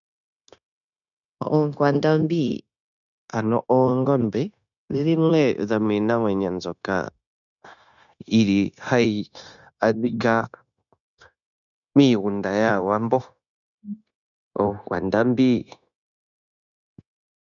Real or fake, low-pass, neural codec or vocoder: fake; 7.2 kHz; codec, 16 kHz, 0.9 kbps, LongCat-Audio-Codec